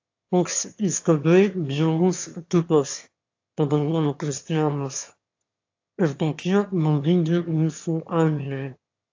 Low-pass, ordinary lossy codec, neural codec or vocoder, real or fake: 7.2 kHz; AAC, 48 kbps; autoencoder, 22.05 kHz, a latent of 192 numbers a frame, VITS, trained on one speaker; fake